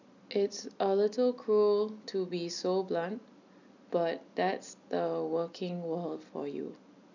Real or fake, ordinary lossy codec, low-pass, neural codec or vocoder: real; none; 7.2 kHz; none